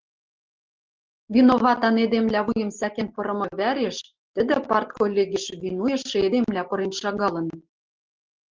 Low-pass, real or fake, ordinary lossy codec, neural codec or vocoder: 7.2 kHz; real; Opus, 16 kbps; none